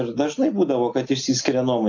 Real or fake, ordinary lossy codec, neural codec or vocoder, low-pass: real; AAC, 48 kbps; none; 7.2 kHz